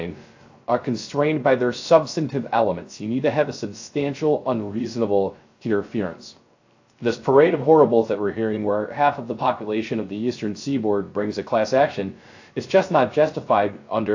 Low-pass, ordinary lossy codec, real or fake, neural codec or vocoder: 7.2 kHz; AAC, 48 kbps; fake; codec, 16 kHz, 0.3 kbps, FocalCodec